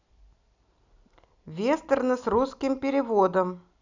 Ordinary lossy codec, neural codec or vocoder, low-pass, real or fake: none; none; 7.2 kHz; real